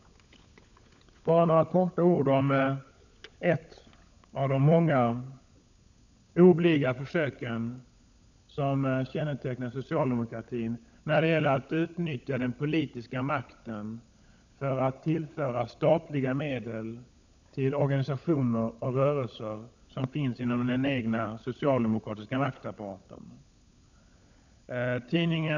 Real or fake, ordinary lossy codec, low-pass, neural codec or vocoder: fake; none; 7.2 kHz; codec, 16 kHz, 16 kbps, FunCodec, trained on LibriTTS, 50 frames a second